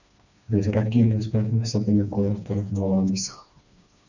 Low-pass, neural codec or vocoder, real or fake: 7.2 kHz; codec, 16 kHz, 2 kbps, FreqCodec, smaller model; fake